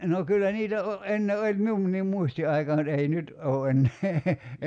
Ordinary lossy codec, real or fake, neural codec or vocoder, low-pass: none; real; none; 9.9 kHz